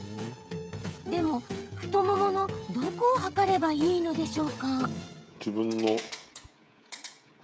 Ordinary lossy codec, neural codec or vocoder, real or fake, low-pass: none; codec, 16 kHz, 16 kbps, FreqCodec, smaller model; fake; none